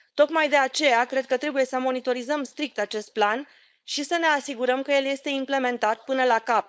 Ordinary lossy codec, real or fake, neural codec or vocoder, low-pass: none; fake; codec, 16 kHz, 4.8 kbps, FACodec; none